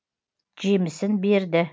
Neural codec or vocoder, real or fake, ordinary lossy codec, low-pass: none; real; none; none